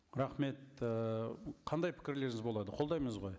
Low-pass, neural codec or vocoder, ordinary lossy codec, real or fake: none; none; none; real